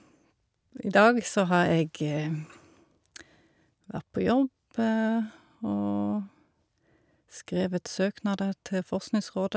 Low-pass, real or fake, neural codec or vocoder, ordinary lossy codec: none; real; none; none